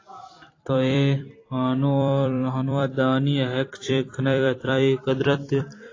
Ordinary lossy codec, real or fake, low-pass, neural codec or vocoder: AAC, 32 kbps; fake; 7.2 kHz; vocoder, 44.1 kHz, 128 mel bands every 256 samples, BigVGAN v2